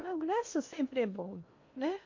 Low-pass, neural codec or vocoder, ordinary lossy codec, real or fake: 7.2 kHz; codec, 16 kHz in and 24 kHz out, 0.8 kbps, FocalCodec, streaming, 65536 codes; MP3, 48 kbps; fake